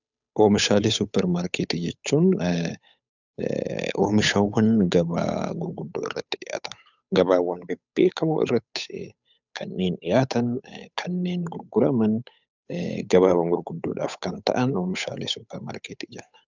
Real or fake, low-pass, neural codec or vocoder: fake; 7.2 kHz; codec, 16 kHz, 8 kbps, FunCodec, trained on Chinese and English, 25 frames a second